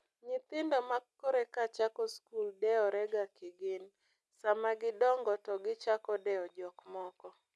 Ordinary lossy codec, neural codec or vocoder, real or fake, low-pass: none; none; real; none